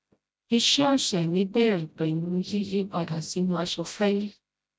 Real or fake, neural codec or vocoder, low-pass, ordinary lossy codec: fake; codec, 16 kHz, 0.5 kbps, FreqCodec, smaller model; none; none